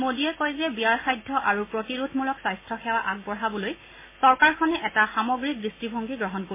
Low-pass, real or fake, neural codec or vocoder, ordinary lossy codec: 3.6 kHz; real; none; MP3, 16 kbps